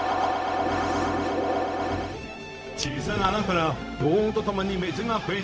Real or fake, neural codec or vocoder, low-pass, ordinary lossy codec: fake; codec, 16 kHz, 0.4 kbps, LongCat-Audio-Codec; none; none